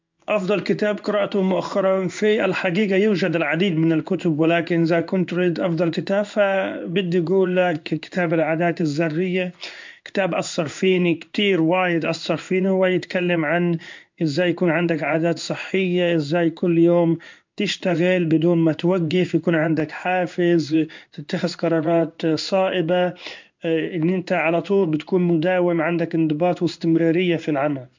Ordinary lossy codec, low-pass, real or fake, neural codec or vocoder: MP3, 64 kbps; 7.2 kHz; fake; codec, 16 kHz in and 24 kHz out, 1 kbps, XY-Tokenizer